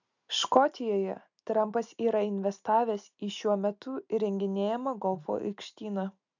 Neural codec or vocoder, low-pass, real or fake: none; 7.2 kHz; real